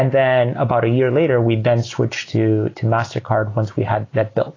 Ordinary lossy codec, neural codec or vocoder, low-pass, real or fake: AAC, 32 kbps; none; 7.2 kHz; real